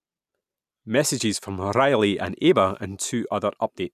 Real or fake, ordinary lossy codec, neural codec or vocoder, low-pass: real; none; none; 14.4 kHz